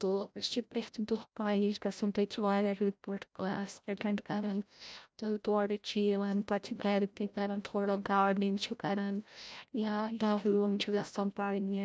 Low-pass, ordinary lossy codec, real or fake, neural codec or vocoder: none; none; fake; codec, 16 kHz, 0.5 kbps, FreqCodec, larger model